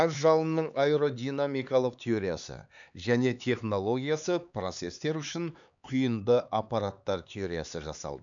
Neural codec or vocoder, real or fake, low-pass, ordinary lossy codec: codec, 16 kHz, 4 kbps, X-Codec, HuBERT features, trained on LibriSpeech; fake; 7.2 kHz; none